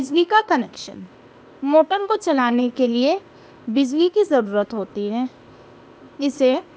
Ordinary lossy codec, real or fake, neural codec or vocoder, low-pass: none; fake; codec, 16 kHz, 0.8 kbps, ZipCodec; none